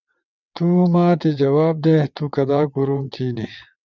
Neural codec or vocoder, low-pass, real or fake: vocoder, 22.05 kHz, 80 mel bands, WaveNeXt; 7.2 kHz; fake